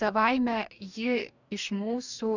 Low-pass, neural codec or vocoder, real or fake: 7.2 kHz; codec, 44.1 kHz, 2.6 kbps, DAC; fake